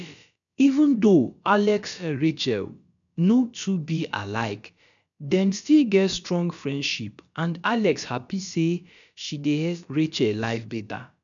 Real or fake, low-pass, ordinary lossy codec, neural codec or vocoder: fake; 7.2 kHz; none; codec, 16 kHz, about 1 kbps, DyCAST, with the encoder's durations